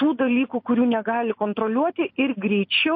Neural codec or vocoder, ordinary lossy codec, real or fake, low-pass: none; MP3, 32 kbps; real; 5.4 kHz